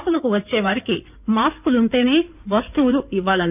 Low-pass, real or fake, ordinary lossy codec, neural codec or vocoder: 3.6 kHz; fake; none; codec, 16 kHz in and 24 kHz out, 2.2 kbps, FireRedTTS-2 codec